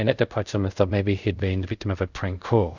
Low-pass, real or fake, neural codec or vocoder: 7.2 kHz; fake; codec, 24 kHz, 0.5 kbps, DualCodec